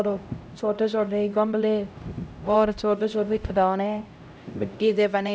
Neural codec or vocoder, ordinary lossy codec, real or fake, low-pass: codec, 16 kHz, 0.5 kbps, X-Codec, HuBERT features, trained on LibriSpeech; none; fake; none